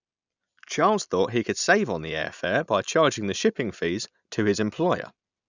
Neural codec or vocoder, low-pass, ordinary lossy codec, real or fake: none; 7.2 kHz; none; real